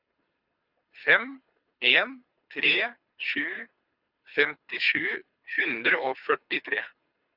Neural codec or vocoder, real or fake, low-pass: codec, 24 kHz, 3 kbps, HILCodec; fake; 5.4 kHz